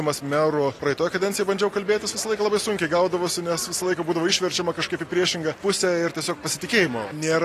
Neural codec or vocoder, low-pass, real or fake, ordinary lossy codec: none; 14.4 kHz; real; AAC, 48 kbps